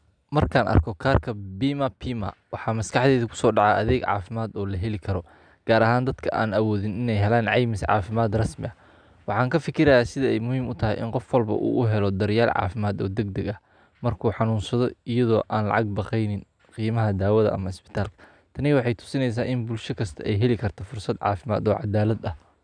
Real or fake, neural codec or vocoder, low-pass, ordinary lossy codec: real; none; 9.9 kHz; none